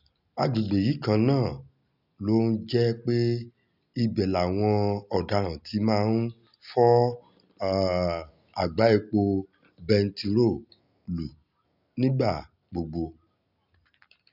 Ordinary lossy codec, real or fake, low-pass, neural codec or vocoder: none; real; 5.4 kHz; none